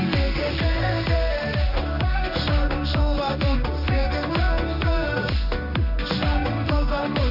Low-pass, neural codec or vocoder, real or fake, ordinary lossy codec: 5.4 kHz; codec, 16 kHz in and 24 kHz out, 1 kbps, XY-Tokenizer; fake; none